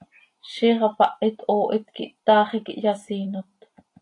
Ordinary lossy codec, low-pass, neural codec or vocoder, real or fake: AAC, 48 kbps; 10.8 kHz; none; real